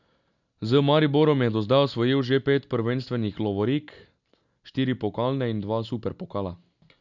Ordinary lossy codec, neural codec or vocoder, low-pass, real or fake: none; none; 7.2 kHz; real